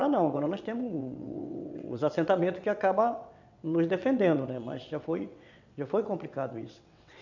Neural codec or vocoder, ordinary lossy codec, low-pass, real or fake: vocoder, 22.05 kHz, 80 mel bands, WaveNeXt; none; 7.2 kHz; fake